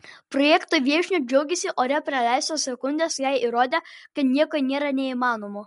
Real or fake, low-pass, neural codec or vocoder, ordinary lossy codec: real; 10.8 kHz; none; MP3, 64 kbps